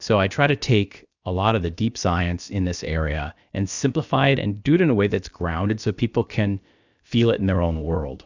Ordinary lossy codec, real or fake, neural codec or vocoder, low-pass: Opus, 64 kbps; fake; codec, 16 kHz, about 1 kbps, DyCAST, with the encoder's durations; 7.2 kHz